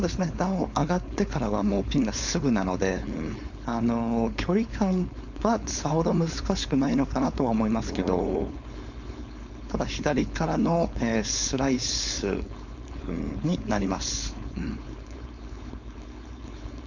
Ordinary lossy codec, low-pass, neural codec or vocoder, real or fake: none; 7.2 kHz; codec, 16 kHz, 4.8 kbps, FACodec; fake